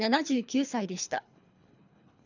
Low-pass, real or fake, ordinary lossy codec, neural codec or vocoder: 7.2 kHz; fake; none; codec, 24 kHz, 3 kbps, HILCodec